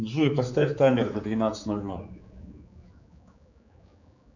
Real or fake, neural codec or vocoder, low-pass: fake; codec, 16 kHz, 4 kbps, X-Codec, HuBERT features, trained on general audio; 7.2 kHz